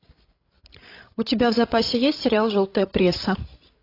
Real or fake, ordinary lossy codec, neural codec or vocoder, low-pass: fake; AAC, 32 kbps; codec, 16 kHz, 16 kbps, FreqCodec, larger model; 5.4 kHz